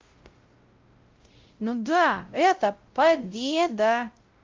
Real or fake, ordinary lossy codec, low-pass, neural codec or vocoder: fake; Opus, 32 kbps; 7.2 kHz; codec, 16 kHz, 0.5 kbps, X-Codec, WavLM features, trained on Multilingual LibriSpeech